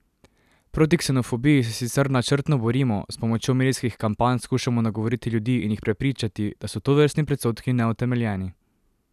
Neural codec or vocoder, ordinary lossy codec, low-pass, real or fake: none; none; 14.4 kHz; real